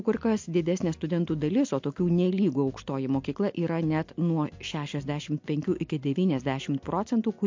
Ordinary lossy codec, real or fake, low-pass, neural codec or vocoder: MP3, 48 kbps; real; 7.2 kHz; none